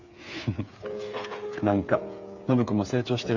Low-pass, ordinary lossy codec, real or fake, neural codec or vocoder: 7.2 kHz; none; fake; codec, 16 kHz, 8 kbps, FreqCodec, smaller model